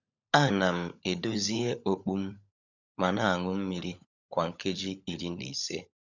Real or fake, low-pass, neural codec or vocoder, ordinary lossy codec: fake; 7.2 kHz; codec, 16 kHz, 16 kbps, FunCodec, trained on LibriTTS, 50 frames a second; none